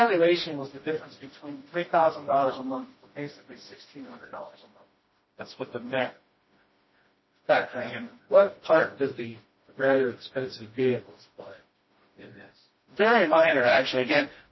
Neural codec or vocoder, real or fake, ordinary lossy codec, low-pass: codec, 16 kHz, 1 kbps, FreqCodec, smaller model; fake; MP3, 24 kbps; 7.2 kHz